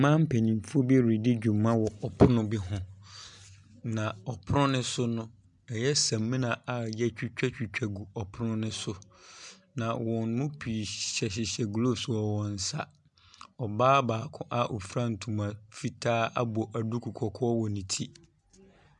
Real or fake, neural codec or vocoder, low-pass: real; none; 10.8 kHz